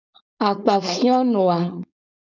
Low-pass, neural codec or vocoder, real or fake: 7.2 kHz; codec, 16 kHz, 4.8 kbps, FACodec; fake